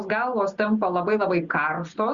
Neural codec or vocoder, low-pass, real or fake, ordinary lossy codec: none; 7.2 kHz; real; Opus, 64 kbps